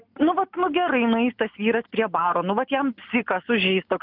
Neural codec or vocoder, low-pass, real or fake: none; 5.4 kHz; real